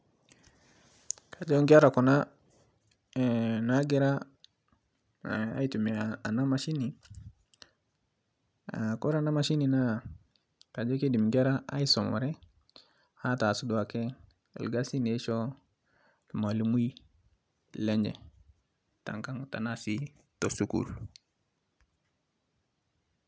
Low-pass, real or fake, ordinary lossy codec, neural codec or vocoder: none; real; none; none